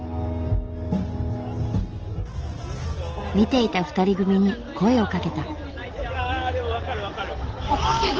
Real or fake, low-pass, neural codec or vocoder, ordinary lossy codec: real; 7.2 kHz; none; Opus, 16 kbps